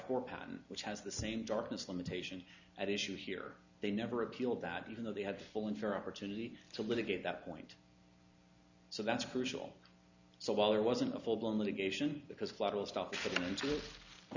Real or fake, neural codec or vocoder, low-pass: real; none; 7.2 kHz